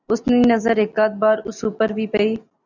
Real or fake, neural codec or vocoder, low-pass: real; none; 7.2 kHz